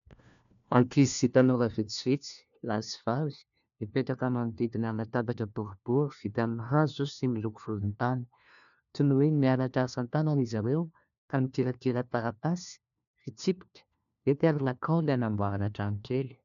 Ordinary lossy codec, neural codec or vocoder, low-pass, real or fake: MP3, 96 kbps; codec, 16 kHz, 1 kbps, FunCodec, trained on LibriTTS, 50 frames a second; 7.2 kHz; fake